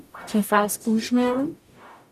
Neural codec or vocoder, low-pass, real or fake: codec, 44.1 kHz, 0.9 kbps, DAC; 14.4 kHz; fake